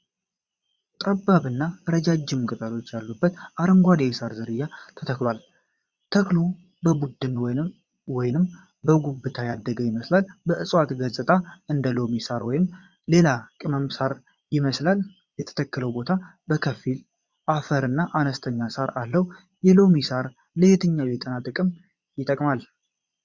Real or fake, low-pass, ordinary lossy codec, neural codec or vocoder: fake; 7.2 kHz; Opus, 64 kbps; vocoder, 22.05 kHz, 80 mel bands, Vocos